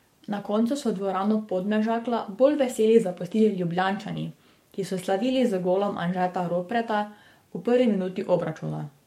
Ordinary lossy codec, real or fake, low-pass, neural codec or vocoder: MP3, 64 kbps; fake; 19.8 kHz; codec, 44.1 kHz, 7.8 kbps, DAC